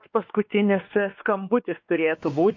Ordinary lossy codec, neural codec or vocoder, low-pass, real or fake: MP3, 48 kbps; codec, 16 kHz, 2 kbps, X-Codec, WavLM features, trained on Multilingual LibriSpeech; 7.2 kHz; fake